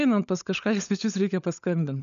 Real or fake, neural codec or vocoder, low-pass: fake; codec, 16 kHz, 16 kbps, FunCodec, trained on LibriTTS, 50 frames a second; 7.2 kHz